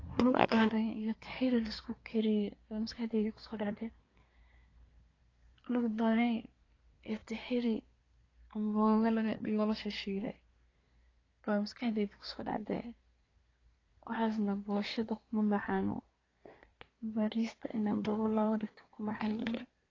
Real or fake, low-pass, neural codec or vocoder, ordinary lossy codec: fake; 7.2 kHz; codec, 24 kHz, 1 kbps, SNAC; AAC, 32 kbps